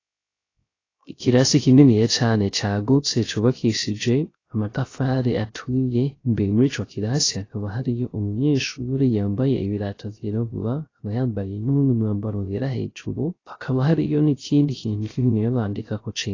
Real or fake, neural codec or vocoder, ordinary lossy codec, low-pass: fake; codec, 16 kHz, 0.3 kbps, FocalCodec; AAC, 32 kbps; 7.2 kHz